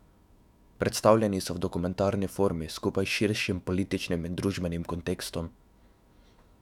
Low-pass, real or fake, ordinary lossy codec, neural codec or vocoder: 19.8 kHz; fake; none; autoencoder, 48 kHz, 128 numbers a frame, DAC-VAE, trained on Japanese speech